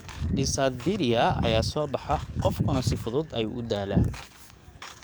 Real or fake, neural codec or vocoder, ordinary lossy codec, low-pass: fake; codec, 44.1 kHz, 7.8 kbps, DAC; none; none